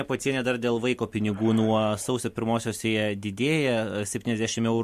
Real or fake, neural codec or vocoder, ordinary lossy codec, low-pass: real; none; MP3, 64 kbps; 14.4 kHz